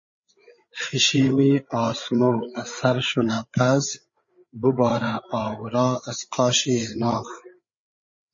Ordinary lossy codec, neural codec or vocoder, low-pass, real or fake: MP3, 32 kbps; codec, 16 kHz, 8 kbps, FreqCodec, larger model; 7.2 kHz; fake